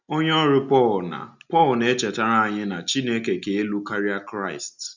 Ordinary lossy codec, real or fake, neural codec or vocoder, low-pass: none; real; none; 7.2 kHz